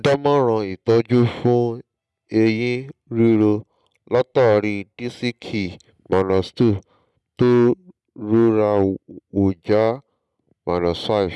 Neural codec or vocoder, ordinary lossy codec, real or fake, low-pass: none; none; real; none